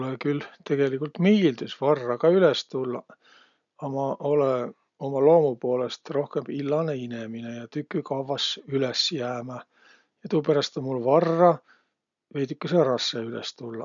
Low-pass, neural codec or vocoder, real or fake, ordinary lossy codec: 7.2 kHz; none; real; none